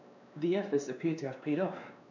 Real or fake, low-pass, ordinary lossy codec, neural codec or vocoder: fake; 7.2 kHz; none; codec, 16 kHz, 2 kbps, X-Codec, WavLM features, trained on Multilingual LibriSpeech